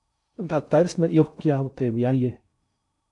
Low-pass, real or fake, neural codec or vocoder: 10.8 kHz; fake; codec, 16 kHz in and 24 kHz out, 0.6 kbps, FocalCodec, streaming, 4096 codes